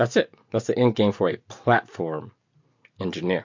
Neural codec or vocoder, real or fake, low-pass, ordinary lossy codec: none; real; 7.2 kHz; MP3, 48 kbps